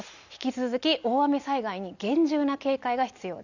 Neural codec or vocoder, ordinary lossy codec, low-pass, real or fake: none; Opus, 64 kbps; 7.2 kHz; real